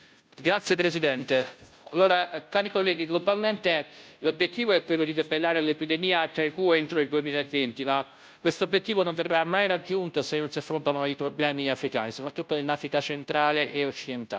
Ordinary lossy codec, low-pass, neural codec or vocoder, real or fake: none; none; codec, 16 kHz, 0.5 kbps, FunCodec, trained on Chinese and English, 25 frames a second; fake